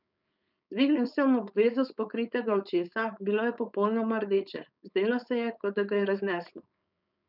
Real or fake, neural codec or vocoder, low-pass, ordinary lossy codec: fake; codec, 16 kHz, 4.8 kbps, FACodec; 5.4 kHz; none